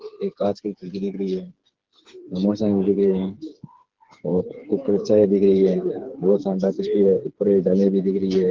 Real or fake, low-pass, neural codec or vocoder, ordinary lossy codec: fake; 7.2 kHz; codec, 16 kHz, 4 kbps, FreqCodec, smaller model; Opus, 16 kbps